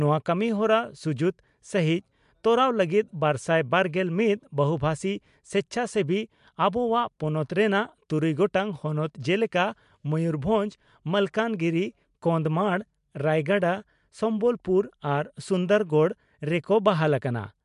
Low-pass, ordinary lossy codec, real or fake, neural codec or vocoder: 10.8 kHz; MP3, 64 kbps; real; none